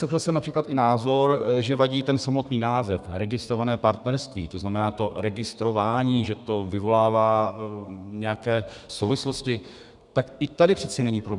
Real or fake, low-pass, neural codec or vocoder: fake; 10.8 kHz; codec, 32 kHz, 1.9 kbps, SNAC